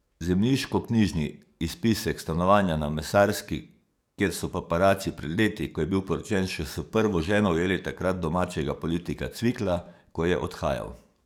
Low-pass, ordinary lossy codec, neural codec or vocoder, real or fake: 19.8 kHz; none; codec, 44.1 kHz, 7.8 kbps, DAC; fake